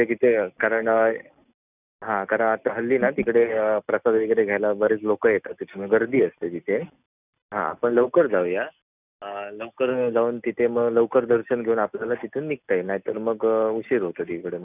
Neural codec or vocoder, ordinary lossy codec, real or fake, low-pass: none; none; real; 3.6 kHz